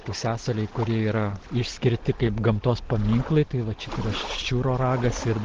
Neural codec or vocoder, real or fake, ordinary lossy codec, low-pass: none; real; Opus, 16 kbps; 7.2 kHz